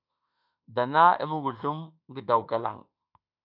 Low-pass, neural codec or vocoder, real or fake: 5.4 kHz; autoencoder, 48 kHz, 32 numbers a frame, DAC-VAE, trained on Japanese speech; fake